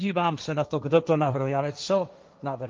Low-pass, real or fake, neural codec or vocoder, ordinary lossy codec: 7.2 kHz; fake; codec, 16 kHz, 1.1 kbps, Voila-Tokenizer; Opus, 24 kbps